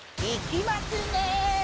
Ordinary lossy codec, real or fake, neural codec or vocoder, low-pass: none; real; none; none